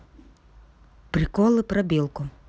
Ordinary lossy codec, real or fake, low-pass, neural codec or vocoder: none; real; none; none